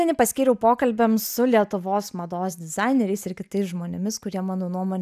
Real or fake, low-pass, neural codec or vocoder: real; 14.4 kHz; none